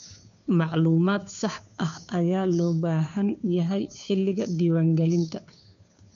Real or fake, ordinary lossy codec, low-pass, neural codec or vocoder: fake; none; 7.2 kHz; codec, 16 kHz, 2 kbps, FunCodec, trained on Chinese and English, 25 frames a second